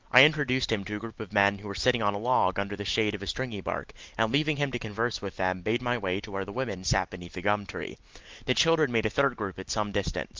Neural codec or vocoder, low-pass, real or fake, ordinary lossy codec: none; 7.2 kHz; real; Opus, 32 kbps